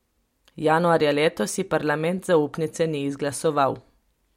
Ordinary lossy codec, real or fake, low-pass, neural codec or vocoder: MP3, 64 kbps; real; 19.8 kHz; none